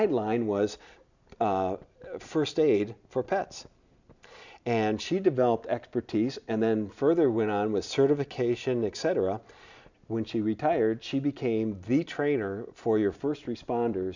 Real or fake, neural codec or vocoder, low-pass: real; none; 7.2 kHz